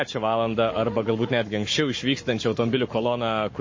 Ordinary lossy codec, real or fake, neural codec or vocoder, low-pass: MP3, 32 kbps; fake; codec, 44.1 kHz, 7.8 kbps, Pupu-Codec; 7.2 kHz